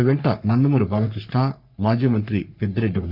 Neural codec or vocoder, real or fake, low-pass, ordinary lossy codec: codec, 44.1 kHz, 3.4 kbps, Pupu-Codec; fake; 5.4 kHz; none